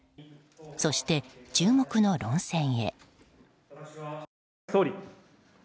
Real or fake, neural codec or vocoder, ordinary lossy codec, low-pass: real; none; none; none